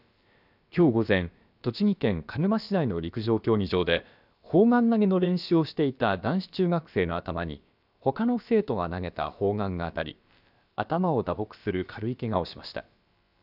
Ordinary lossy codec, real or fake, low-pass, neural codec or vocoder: none; fake; 5.4 kHz; codec, 16 kHz, about 1 kbps, DyCAST, with the encoder's durations